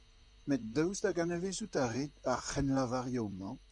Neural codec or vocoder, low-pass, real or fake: vocoder, 44.1 kHz, 128 mel bands, Pupu-Vocoder; 10.8 kHz; fake